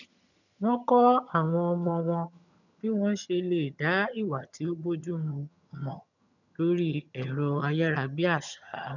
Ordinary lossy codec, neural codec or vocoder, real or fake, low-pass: none; vocoder, 22.05 kHz, 80 mel bands, HiFi-GAN; fake; 7.2 kHz